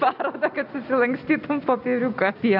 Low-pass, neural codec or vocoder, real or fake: 5.4 kHz; none; real